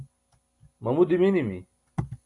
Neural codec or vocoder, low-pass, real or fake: none; 10.8 kHz; real